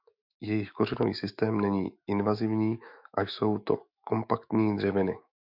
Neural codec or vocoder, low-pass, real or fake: autoencoder, 48 kHz, 128 numbers a frame, DAC-VAE, trained on Japanese speech; 5.4 kHz; fake